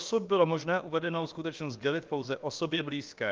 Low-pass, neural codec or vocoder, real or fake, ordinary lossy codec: 7.2 kHz; codec, 16 kHz, about 1 kbps, DyCAST, with the encoder's durations; fake; Opus, 32 kbps